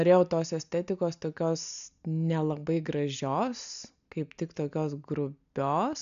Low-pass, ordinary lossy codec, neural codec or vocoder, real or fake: 7.2 kHz; AAC, 96 kbps; none; real